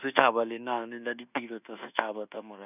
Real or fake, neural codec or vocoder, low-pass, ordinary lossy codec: fake; codec, 24 kHz, 1.2 kbps, DualCodec; 3.6 kHz; none